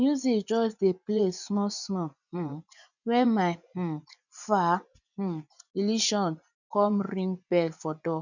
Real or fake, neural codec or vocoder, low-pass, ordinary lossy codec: fake; vocoder, 24 kHz, 100 mel bands, Vocos; 7.2 kHz; none